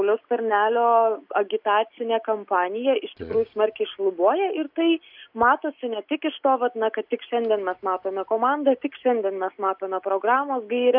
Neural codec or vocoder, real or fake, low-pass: none; real; 5.4 kHz